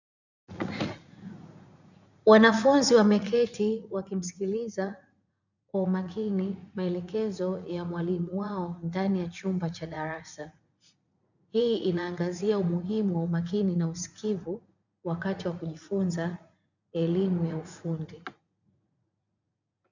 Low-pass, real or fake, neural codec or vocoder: 7.2 kHz; fake; vocoder, 44.1 kHz, 80 mel bands, Vocos